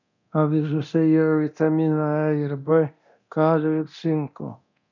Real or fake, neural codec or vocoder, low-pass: fake; codec, 24 kHz, 0.9 kbps, DualCodec; 7.2 kHz